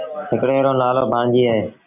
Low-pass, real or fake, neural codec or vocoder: 3.6 kHz; real; none